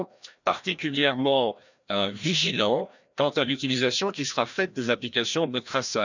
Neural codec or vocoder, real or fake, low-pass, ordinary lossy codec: codec, 16 kHz, 1 kbps, FreqCodec, larger model; fake; 7.2 kHz; none